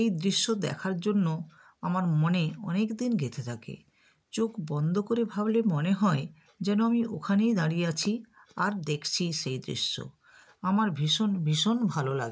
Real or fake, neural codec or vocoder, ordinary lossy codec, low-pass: real; none; none; none